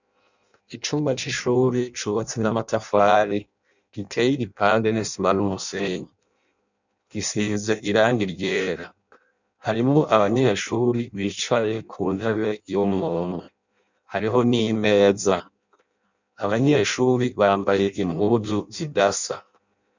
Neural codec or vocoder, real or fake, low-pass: codec, 16 kHz in and 24 kHz out, 0.6 kbps, FireRedTTS-2 codec; fake; 7.2 kHz